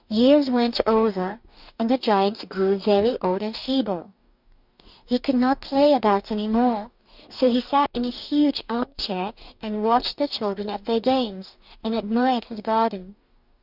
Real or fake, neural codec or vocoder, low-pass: fake; codec, 44.1 kHz, 2.6 kbps, DAC; 5.4 kHz